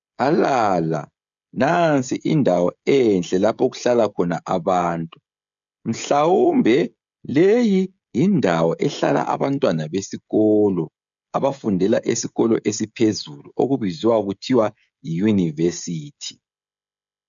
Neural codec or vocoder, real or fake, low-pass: codec, 16 kHz, 16 kbps, FreqCodec, smaller model; fake; 7.2 kHz